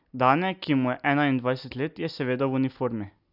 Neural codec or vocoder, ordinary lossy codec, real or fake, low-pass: none; none; real; 5.4 kHz